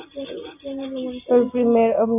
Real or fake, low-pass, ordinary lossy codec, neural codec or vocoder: real; 3.6 kHz; MP3, 32 kbps; none